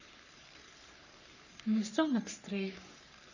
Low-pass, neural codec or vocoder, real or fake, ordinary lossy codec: 7.2 kHz; codec, 44.1 kHz, 3.4 kbps, Pupu-Codec; fake; none